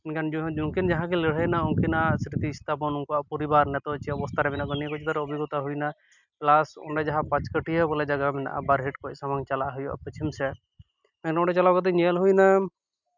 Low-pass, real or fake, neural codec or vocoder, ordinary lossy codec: 7.2 kHz; real; none; none